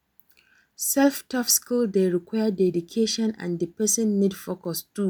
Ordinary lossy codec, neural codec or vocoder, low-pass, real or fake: none; none; none; real